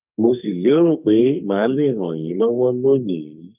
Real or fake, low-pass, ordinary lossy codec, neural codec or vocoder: fake; 3.6 kHz; none; codec, 44.1 kHz, 2.6 kbps, SNAC